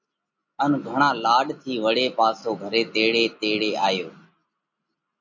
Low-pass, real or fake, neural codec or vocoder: 7.2 kHz; real; none